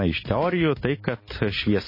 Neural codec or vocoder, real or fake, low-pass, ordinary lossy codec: none; real; 5.4 kHz; MP3, 24 kbps